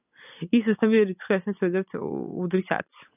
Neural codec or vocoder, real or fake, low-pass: none; real; 3.6 kHz